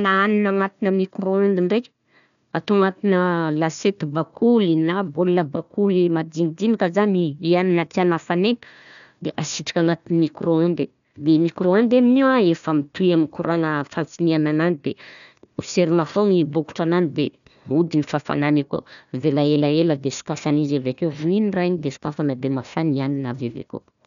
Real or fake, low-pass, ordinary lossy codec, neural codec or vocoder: fake; 7.2 kHz; none; codec, 16 kHz, 1 kbps, FunCodec, trained on Chinese and English, 50 frames a second